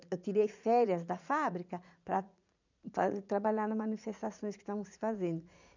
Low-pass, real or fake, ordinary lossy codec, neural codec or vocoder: 7.2 kHz; fake; none; vocoder, 44.1 kHz, 80 mel bands, Vocos